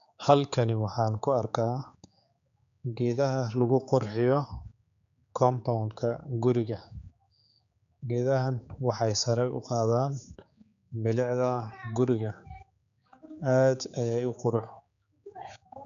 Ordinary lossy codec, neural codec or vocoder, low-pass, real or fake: none; codec, 16 kHz, 4 kbps, X-Codec, HuBERT features, trained on general audio; 7.2 kHz; fake